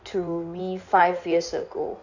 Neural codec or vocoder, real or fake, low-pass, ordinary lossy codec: codec, 16 kHz in and 24 kHz out, 2.2 kbps, FireRedTTS-2 codec; fake; 7.2 kHz; none